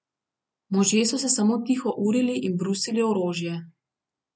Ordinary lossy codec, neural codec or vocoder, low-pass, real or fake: none; none; none; real